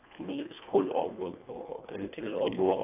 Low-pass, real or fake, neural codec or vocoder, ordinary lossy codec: 3.6 kHz; fake; codec, 24 kHz, 1.5 kbps, HILCodec; AAC, 24 kbps